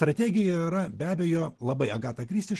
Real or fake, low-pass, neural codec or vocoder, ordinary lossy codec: real; 10.8 kHz; none; Opus, 16 kbps